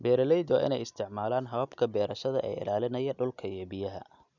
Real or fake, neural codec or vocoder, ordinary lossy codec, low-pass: real; none; none; 7.2 kHz